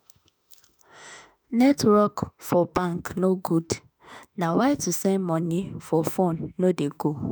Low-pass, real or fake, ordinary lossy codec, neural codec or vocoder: none; fake; none; autoencoder, 48 kHz, 32 numbers a frame, DAC-VAE, trained on Japanese speech